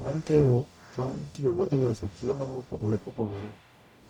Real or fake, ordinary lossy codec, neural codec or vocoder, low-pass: fake; none; codec, 44.1 kHz, 0.9 kbps, DAC; 19.8 kHz